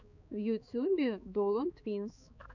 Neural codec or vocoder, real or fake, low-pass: codec, 16 kHz, 4 kbps, X-Codec, HuBERT features, trained on balanced general audio; fake; 7.2 kHz